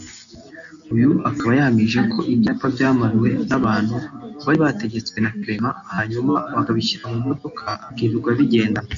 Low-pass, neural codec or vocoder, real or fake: 7.2 kHz; none; real